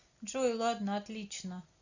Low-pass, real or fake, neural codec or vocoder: 7.2 kHz; real; none